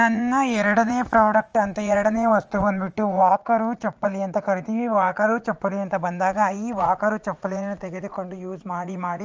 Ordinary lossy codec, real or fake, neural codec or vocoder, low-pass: Opus, 24 kbps; fake; vocoder, 44.1 kHz, 80 mel bands, Vocos; 7.2 kHz